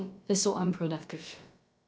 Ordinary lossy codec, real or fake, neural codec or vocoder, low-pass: none; fake; codec, 16 kHz, about 1 kbps, DyCAST, with the encoder's durations; none